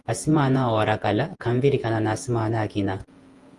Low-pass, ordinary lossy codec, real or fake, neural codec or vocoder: 10.8 kHz; Opus, 24 kbps; fake; vocoder, 48 kHz, 128 mel bands, Vocos